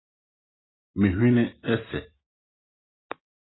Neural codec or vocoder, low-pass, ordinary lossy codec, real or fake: none; 7.2 kHz; AAC, 16 kbps; real